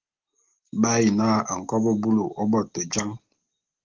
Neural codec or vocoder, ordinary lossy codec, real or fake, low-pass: none; Opus, 16 kbps; real; 7.2 kHz